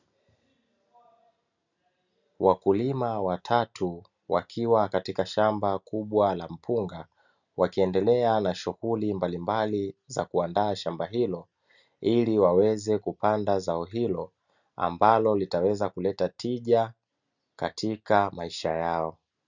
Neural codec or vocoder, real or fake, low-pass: none; real; 7.2 kHz